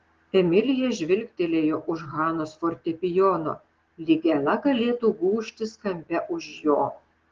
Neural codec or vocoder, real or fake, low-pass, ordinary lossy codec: none; real; 7.2 kHz; Opus, 16 kbps